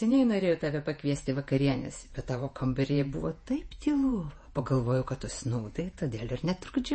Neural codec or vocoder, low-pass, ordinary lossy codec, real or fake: vocoder, 48 kHz, 128 mel bands, Vocos; 9.9 kHz; MP3, 32 kbps; fake